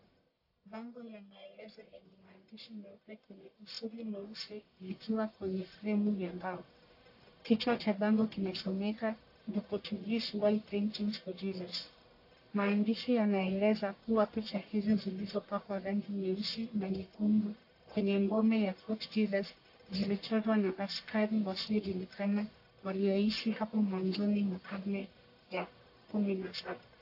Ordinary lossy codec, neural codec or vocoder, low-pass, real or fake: AAC, 32 kbps; codec, 44.1 kHz, 1.7 kbps, Pupu-Codec; 5.4 kHz; fake